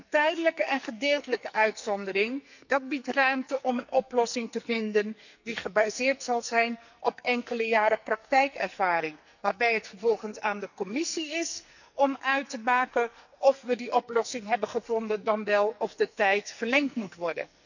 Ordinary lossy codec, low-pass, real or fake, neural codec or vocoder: none; 7.2 kHz; fake; codec, 44.1 kHz, 2.6 kbps, SNAC